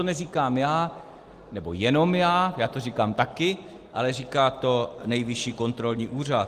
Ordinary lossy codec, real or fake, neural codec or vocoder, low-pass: Opus, 24 kbps; real; none; 14.4 kHz